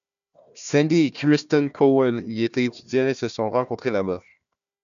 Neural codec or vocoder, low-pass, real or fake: codec, 16 kHz, 1 kbps, FunCodec, trained on Chinese and English, 50 frames a second; 7.2 kHz; fake